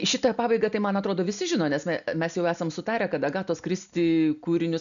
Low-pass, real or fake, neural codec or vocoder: 7.2 kHz; real; none